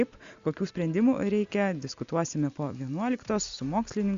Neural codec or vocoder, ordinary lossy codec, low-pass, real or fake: none; Opus, 64 kbps; 7.2 kHz; real